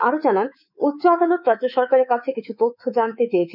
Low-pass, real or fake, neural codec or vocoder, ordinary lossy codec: 5.4 kHz; fake; codec, 24 kHz, 3.1 kbps, DualCodec; none